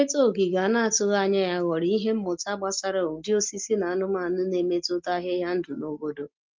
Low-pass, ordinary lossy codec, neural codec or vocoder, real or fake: 7.2 kHz; Opus, 32 kbps; none; real